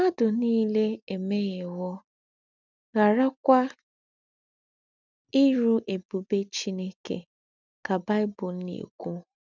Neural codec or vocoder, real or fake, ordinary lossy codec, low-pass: none; real; none; 7.2 kHz